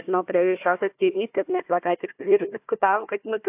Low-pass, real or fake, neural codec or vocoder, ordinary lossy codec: 3.6 kHz; fake; codec, 16 kHz, 1 kbps, FunCodec, trained on Chinese and English, 50 frames a second; AAC, 32 kbps